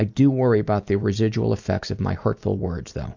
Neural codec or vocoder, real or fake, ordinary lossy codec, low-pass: none; real; MP3, 64 kbps; 7.2 kHz